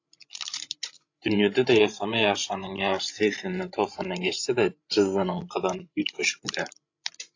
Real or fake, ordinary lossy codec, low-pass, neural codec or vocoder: fake; AAC, 48 kbps; 7.2 kHz; codec, 16 kHz, 16 kbps, FreqCodec, larger model